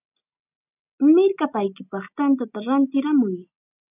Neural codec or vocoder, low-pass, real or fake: none; 3.6 kHz; real